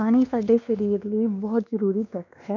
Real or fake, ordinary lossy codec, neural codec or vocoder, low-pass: fake; none; codec, 16 kHz, 2 kbps, X-Codec, WavLM features, trained on Multilingual LibriSpeech; 7.2 kHz